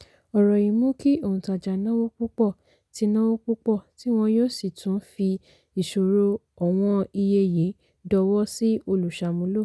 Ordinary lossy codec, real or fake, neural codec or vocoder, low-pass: none; real; none; none